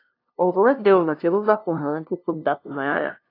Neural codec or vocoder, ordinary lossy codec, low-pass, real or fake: codec, 16 kHz, 0.5 kbps, FunCodec, trained on LibriTTS, 25 frames a second; AAC, 32 kbps; 5.4 kHz; fake